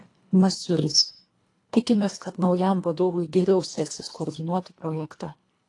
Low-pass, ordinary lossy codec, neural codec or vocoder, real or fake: 10.8 kHz; AAC, 48 kbps; codec, 24 kHz, 1.5 kbps, HILCodec; fake